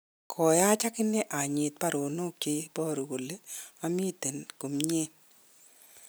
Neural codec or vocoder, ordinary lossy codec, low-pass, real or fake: none; none; none; real